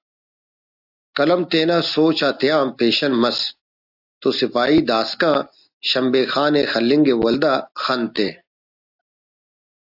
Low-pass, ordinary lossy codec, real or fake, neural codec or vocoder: 5.4 kHz; AAC, 48 kbps; real; none